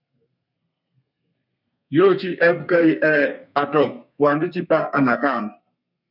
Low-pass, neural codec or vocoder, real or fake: 5.4 kHz; codec, 32 kHz, 1.9 kbps, SNAC; fake